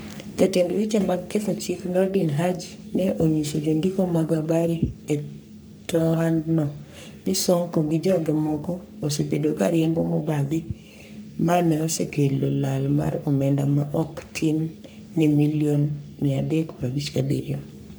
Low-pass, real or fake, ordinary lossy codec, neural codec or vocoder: none; fake; none; codec, 44.1 kHz, 3.4 kbps, Pupu-Codec